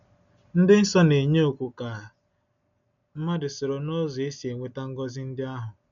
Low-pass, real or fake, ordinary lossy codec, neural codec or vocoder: 7.2 kHz; real; none; none